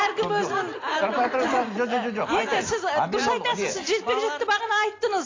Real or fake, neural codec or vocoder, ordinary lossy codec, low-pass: real; none; AAC, 32 kbps; 7.2 kHz